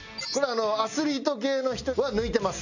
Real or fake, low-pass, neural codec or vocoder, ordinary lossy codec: real; 7.2 kHz; none; none